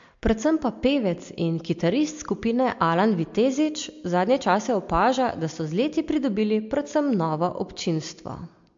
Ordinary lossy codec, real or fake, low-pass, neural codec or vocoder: MP3, 48 kbps; real; 7.2 kHz; none